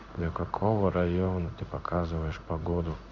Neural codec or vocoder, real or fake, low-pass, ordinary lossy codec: codec, 16 kHz in and 24 kHz out, 1 kbps, XY-Tokenizer; fake; 7.2 kHz; none